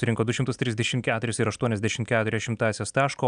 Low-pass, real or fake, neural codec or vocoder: 9.9 kHz; real; none